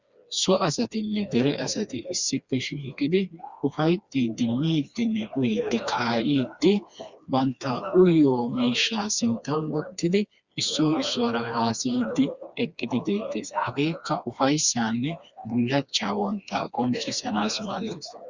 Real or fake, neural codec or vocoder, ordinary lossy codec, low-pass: fake; codec, 16 kHz, 2 kbps, FreqCodec, smaller model; Opus, 64 kbps; 7.2 kHz